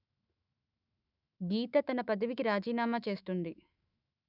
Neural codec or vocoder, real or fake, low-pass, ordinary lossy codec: autoencoder, 48 kHz, 128 numbers a frame, DAC-VAE, trained on Japanese speech; fake; 5.4 kHz; none